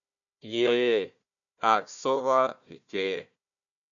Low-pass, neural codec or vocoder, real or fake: 7.2 kHz; codec, 16 kHz, 1 kbps, FunCodec, trained on Chinese and English, 50 frames a second; fake